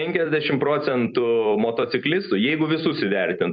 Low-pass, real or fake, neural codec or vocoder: 7.2 kHz; fake; vocoder, 44.1 kHz, 128 mel bands every 256 samples, BigVGAN v2